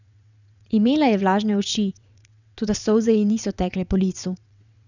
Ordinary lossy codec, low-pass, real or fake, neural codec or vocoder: none; 7.2 kHz; fake; vocoder, 22.05 kHz, 80 mel bands, WaveNeXt